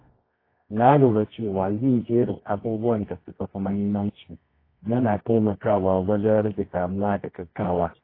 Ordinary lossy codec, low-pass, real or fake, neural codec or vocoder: AAC, 24 kbps; 5.4 kHz; fake; codec, 24 kHz, 0.9 kbps, WavTokenizer, medium music audio release